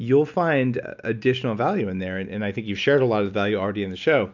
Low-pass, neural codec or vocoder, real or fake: 7.2 kHz; none; real